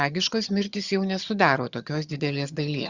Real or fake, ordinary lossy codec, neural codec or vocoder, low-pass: fake; Opus, 64 kbps; vocoder, 22.05 kHz, 80 mel bands, HiFi-GAN; 7.2 kHz